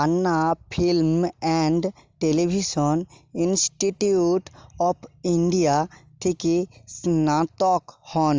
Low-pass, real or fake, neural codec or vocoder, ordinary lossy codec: 7.2 kHz; real; none; Opus, 32 kbps